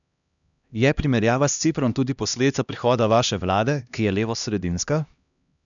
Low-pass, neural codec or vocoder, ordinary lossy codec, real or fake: 7.2 kHz; codec, 16 kHz, 1 kbps, X-Codec, HuBERT features, trained on LibriSpeech; none; fake